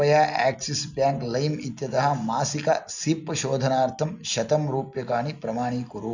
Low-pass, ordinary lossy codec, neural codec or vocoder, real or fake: 7.2 kHz; none; none; real